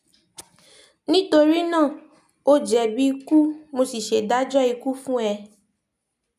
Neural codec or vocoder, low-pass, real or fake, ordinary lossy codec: none; 14.4 kHz; real; none